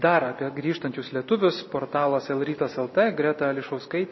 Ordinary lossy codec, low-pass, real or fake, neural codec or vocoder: MP3, 24 kbps; 7.2 kHz; real; none